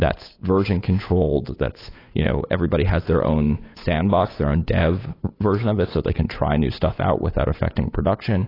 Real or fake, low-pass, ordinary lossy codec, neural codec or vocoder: fake; 5.4 kHz; AAC, 24 kbps; codec, 16 kHz, 8 kbps, FunCodec, trained on Chinese and English, 25 frames a second